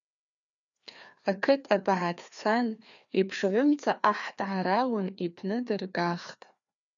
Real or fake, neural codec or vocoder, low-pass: fake; codec, 16 kHz, 2 kbps, FreqCodec, larger model; 7.2 kHz